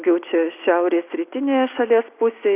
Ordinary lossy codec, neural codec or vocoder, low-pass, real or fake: Opus, 64 kbps; none; 3.6 kHz; real